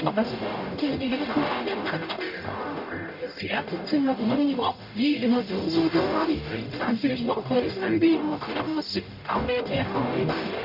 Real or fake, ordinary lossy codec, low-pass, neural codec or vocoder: fake; none; 5.4 kHz; codec, 44.1 kHz, 0.9 kbps, DAC